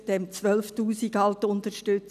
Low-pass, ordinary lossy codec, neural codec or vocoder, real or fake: 14.4 kHz; none; none; real